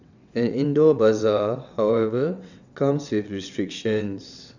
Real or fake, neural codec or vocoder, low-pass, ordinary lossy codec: fake; vocoder, 22.05 kHz, 80 mel bands, WaveNeXt; 7.2 kHz; none